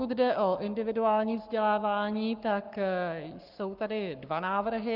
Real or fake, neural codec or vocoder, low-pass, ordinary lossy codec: fake; codec, 44.1 kHz, 7.8 kbps, Pupu-Codec; 5.4 kHz; Opus, 32 kbps